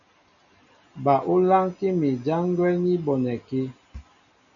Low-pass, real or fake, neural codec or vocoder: 7.2 kHz; real; none